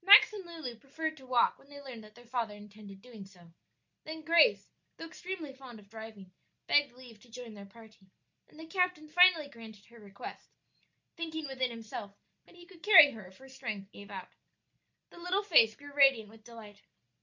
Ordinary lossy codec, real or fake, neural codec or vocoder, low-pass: MP3, 64 kbps; real; none; 7.2 kHz